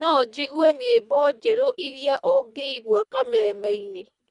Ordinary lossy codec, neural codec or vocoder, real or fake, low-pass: none; codec, 24 kHz, 1.5 kbps, HILCodec; fake; 10.8 kHz